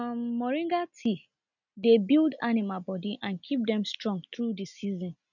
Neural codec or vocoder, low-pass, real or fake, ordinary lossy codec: none; 7.2 kHz; real; none